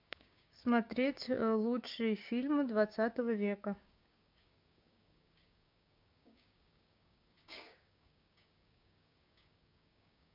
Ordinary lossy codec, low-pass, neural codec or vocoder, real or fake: MP3, 48 kbps; 5.4 kHz; codec, 16 kHz, 6 kbps, DAC; fake